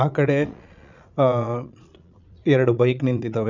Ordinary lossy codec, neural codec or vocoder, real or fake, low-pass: none; vocoder, 22.05 kHz, 80 mel bands, Vocos; fake; 7.2 kHz